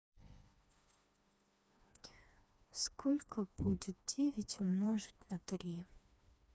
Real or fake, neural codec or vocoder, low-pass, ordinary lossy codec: fake; codec, 16 kHz, 2 kbps, FreqCodec, smaller model; none; none